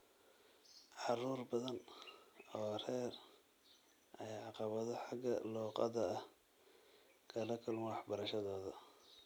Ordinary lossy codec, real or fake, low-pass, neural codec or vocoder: none; real; none; none